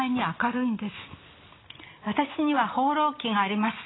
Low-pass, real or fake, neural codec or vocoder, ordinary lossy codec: 7.2 kHz; real; none; AAC, 16 kbps